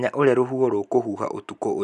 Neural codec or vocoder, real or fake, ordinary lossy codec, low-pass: none; real; none; 10.8 kHz